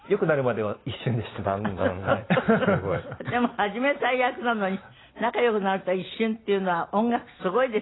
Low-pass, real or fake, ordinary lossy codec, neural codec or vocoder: 7.2 kHz; real; AAC, 16 kbps; none